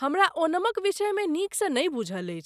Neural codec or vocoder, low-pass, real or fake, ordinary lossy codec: none; 14.4 kHz; real; none